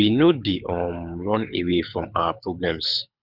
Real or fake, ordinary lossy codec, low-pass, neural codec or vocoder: fake; none; 5.4 kHz; codec, 24 kHz, 6 kbps, HILCodec